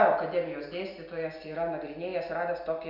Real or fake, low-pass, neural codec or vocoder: real; 5.4 kHz; none